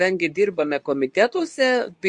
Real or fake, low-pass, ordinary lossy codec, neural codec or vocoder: fake; 10.8 kHz; MP3, 64 kbps; codec, 24 kHz, 0.9 kbps, WavTokenizer, medium speech release version 2